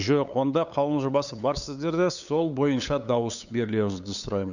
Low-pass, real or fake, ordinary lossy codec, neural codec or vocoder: 7.2 kHz; fake; none; codec, 16 kHz, 8 kbps, FunCodec, trained on LibriTTS, 25 frames a second